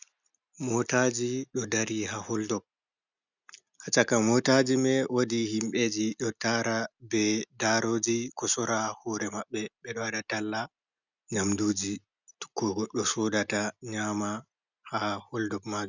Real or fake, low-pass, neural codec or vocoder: real; 7.2 kHz; none